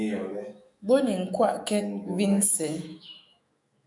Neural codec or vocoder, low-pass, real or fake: autoencoder, 48 kHz, 128 numbers a frame, DAC-VAE, trained on Japanese speech; 10.8 kHz; fake